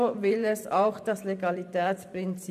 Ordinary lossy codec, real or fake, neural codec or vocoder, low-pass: MP3, 96 kbps; fake; vocoder, 44.1 kHz, 128 mel bands every 512 samples, BigVGAN v2; 14.4 kHz